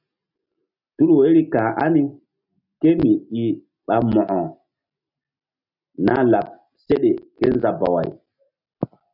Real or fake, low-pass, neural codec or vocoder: real; 5.4 kHz; none